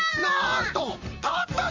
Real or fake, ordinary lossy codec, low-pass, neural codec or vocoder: fake; MP3, 64 kbps; 7.2 kHz; vocoder, 44.1 kHz, 128 mel bands, Pupu-Vocoder